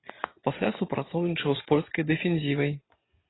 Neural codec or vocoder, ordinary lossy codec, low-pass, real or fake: none; AAC, 16 kbps; 7.2 kHz; real